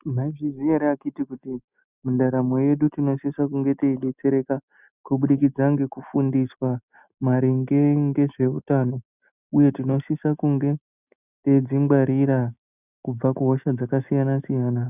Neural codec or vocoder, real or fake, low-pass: none; real; 3.6 kHz